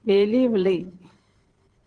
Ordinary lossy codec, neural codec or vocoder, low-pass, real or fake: Opus, 16 kbps; vocoder, 22.05 kHz, 80 mel bands, Vocos; 9.9 kHz; fake